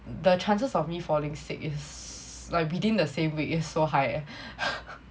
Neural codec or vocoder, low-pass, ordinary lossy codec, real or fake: none; none; none; real